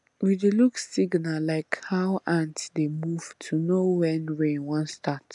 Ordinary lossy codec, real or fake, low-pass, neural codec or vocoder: none; real; 10.8 kHz; none